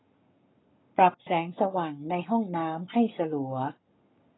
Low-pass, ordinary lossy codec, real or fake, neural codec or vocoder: 7.2 kHz; AAC, 16 kbps; fake; codec, 44.1 kHz, 7.8 kbps, Pupu-Codec